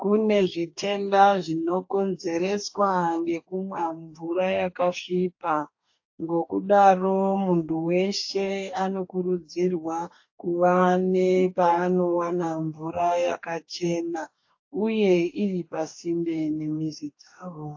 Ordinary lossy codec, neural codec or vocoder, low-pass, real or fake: AAC, 48 kbps; codec, 44.1 kHz, 2.6 kbps, DAC; 7.2 kHz; fake